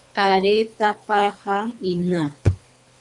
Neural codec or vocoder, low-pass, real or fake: codec, 24 kHz, 3 kbps, HILCodec; 10.8 kHz; fake